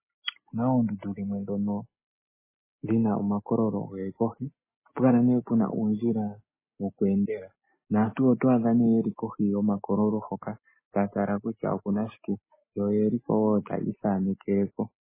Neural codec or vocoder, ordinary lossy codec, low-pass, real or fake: none; MP3, 16 kbps; 3.6 kHz; real